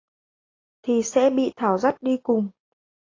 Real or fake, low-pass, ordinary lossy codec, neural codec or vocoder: real; 7.2 kHz; AAC, 32 kbps; none